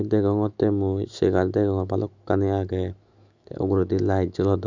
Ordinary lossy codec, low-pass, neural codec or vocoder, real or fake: Opus, 64 kbps; 7.2 kHz; autoencoder, 48 kHz, 128 numbers a frame, DAC-VAE, trained on Japanese speech; fake